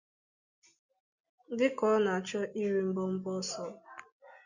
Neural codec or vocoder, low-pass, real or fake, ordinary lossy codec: none; 7.2 kHz; real; Opus, 64 kbps